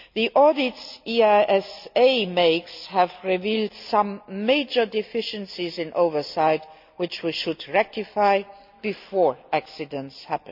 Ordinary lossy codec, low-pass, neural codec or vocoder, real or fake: MP3, 48 kbps; 5.4 kHz; none; real